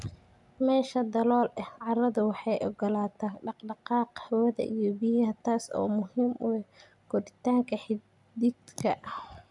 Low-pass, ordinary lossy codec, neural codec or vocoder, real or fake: 10.8 kHz; none; none; real